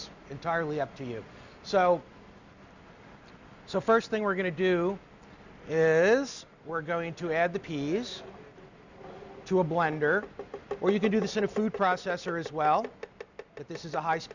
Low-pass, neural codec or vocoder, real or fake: 7.2 kHz; none; real